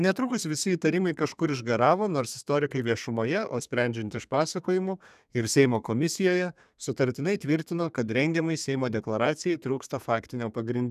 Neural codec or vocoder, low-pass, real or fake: codec, 32 kHz, 1.9 kbps, SNAC; 14.4 kHz; fake